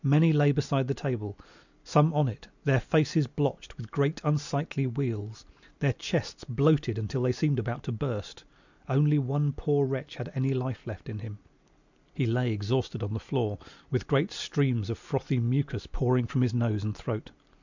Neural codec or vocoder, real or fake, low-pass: none; real; 7.2 kHz